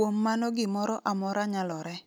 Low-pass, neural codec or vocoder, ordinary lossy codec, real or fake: none; none; none; real